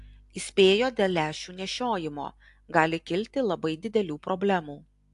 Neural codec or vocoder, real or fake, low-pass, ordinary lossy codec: none; real; 10.8 kHz; AAC, 64 kbps